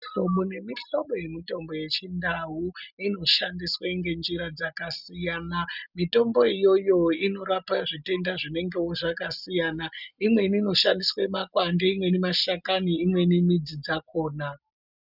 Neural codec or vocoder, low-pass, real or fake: none; 5.4 kHz; real